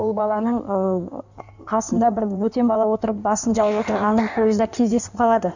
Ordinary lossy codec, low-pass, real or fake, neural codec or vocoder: none; 7.2 kHz; fake; codec, 16 kHz in and 24 kHz out, 1.1 kbps, FireRedTTS-2 codec